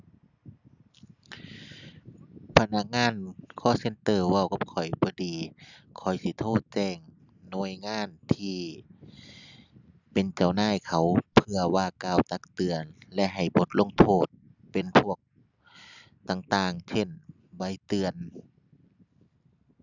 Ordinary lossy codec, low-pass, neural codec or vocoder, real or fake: none; 7.2 kHz; none; real